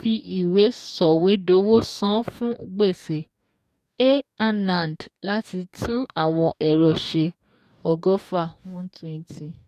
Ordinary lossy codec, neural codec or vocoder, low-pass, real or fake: none; codec, 44.1 kHz, 2.6 kbps, DAC; 14.4 kHz; fake